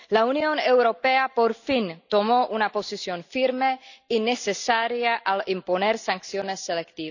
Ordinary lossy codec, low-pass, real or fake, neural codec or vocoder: none; 7.2 kHz; real; none